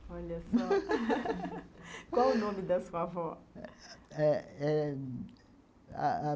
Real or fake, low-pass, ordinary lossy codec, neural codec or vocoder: real; none; none; none